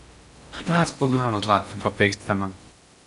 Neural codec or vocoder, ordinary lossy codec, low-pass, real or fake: codec, 16 kHz in and 24 kHz out, 0.6 kbps, FocalCodec, streaming, 4096 codes; none; 10.8 kHz; fake